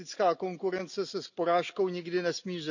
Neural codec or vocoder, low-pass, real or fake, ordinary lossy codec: none; 7.2 kHz; real; none